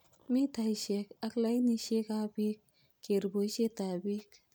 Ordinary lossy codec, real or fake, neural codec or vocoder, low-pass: none; real; none; none